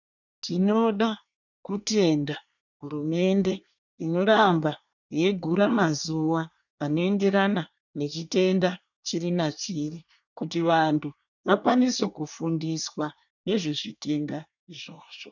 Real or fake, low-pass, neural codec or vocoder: fake; 7.2 kHz; codec, 24 kHz, 1 kbps, SNAC